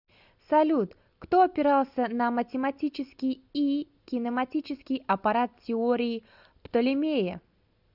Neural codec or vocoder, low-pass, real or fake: none; 5.4 kHz; real